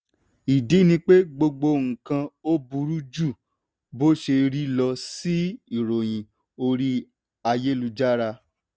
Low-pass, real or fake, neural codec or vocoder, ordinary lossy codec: none; real; none; none